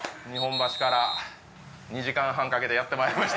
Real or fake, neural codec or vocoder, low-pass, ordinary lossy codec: real; none; none; none